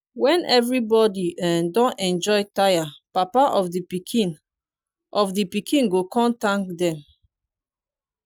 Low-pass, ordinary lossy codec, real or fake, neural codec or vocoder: 19.8 kHz; none; real; none